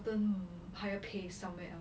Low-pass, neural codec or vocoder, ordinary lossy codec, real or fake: none; none; none; real